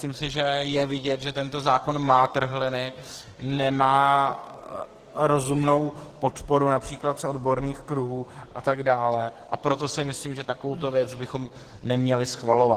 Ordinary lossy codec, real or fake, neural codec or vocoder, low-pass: Opus, 16 kbps; fake; codec, 44.1 kHz, 2.6 kbps, SNAC; 14.4 kHz